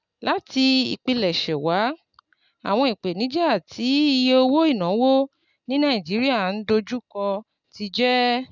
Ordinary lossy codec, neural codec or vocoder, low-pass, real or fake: none; none; 7.2 kHz; real